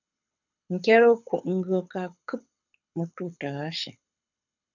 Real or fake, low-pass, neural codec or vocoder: fake; 7.2 kHz; codec, 24 kHz, 6 kbps, HILCodec